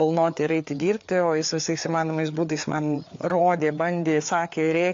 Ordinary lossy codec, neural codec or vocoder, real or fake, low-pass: AAC, 48 kbps; codec, 16 kHz, 4 kbps, FreqCodec, larger model; fake; 7.2 kHz